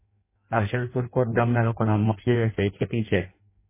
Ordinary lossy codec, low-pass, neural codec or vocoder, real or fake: MP3, 16 kbps; 3.6 kHz; codec, 16 kHz in and 24 kHz out, 0.6 kbps, FireRedTTS-2 codec; fake